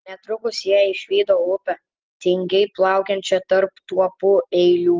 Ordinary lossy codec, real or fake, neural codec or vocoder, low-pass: Opus, 16 kbps; real; none; 7.2 kHz